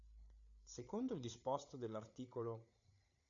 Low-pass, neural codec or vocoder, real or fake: 7.2 kHz; codec, 16 kHz, 8 kbps, FreqCodec, larger model; fake